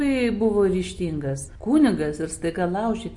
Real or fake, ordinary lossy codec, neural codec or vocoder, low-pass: real; MP3, 48 kbps; none; 10.8 kHz